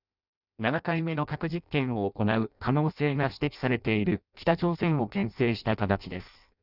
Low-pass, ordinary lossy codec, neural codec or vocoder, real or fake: 5.4 kHz; none; codec, 16 kHz in and 24 kHz out, 0.6 kbps, FireRedTTS-2 codec; fake